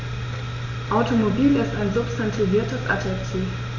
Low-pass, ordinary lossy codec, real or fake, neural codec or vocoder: 7.2 kHz; none; real; none